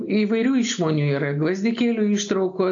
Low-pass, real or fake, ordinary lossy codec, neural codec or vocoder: 7.2 kHz; real; AAC, 64 kbps; none